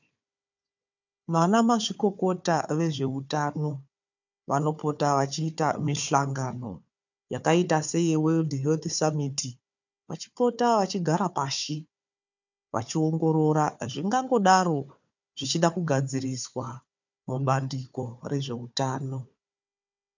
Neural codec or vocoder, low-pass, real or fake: codec, 16 kHz, 4 kbps, FunCodec, trained on Chinese and English, 50 frames a second; 7.2 kHz; fake